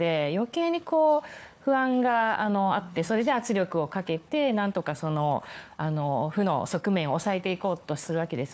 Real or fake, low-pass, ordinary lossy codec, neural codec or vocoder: fake; none; none; codec, 16 kHz, 4 kbps, FunCodec, trained on Chinese and English, 50 frames a second